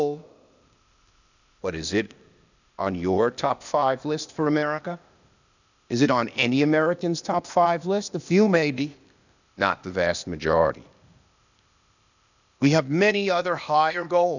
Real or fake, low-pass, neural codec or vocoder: fake; 7.2 kHz; codec, 16 kHz, 0.8 kbps, ZipCodec